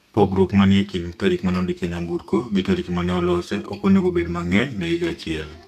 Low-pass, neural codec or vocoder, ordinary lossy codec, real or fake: 14.4 kHz; codec, 32 kHz, 1.9 kbps, SNAC; none; fake